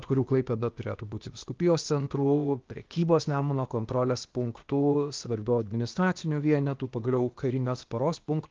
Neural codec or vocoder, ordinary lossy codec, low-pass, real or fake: codec, 16 kHz, 0.8 kbps, ZipCodec; Opus, 24 kbps; 7.2 kHz; fake